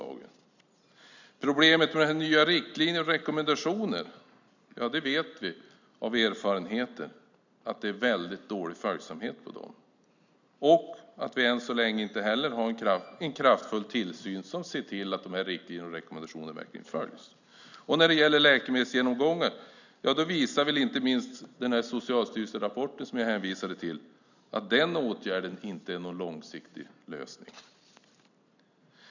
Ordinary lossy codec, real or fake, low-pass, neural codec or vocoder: none; real; 7.2 kHz; none